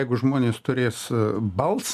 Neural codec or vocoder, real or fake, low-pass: none; real; 14.4 kHz